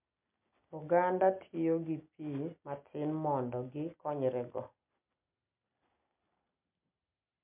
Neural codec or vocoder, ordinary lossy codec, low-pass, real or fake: none; MP3, 32 kbps; 3.6 kHz; real